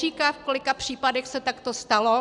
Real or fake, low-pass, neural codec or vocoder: real; 10.8 kHz; none